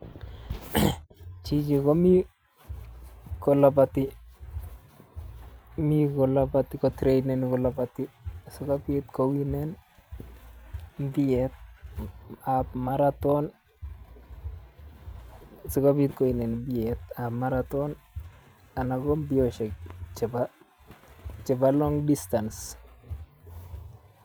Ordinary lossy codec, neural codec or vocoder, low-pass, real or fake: none; vocoder, 44.1 kHz, 128 mel bands every 512 samples, BigVGAN v2; none; fake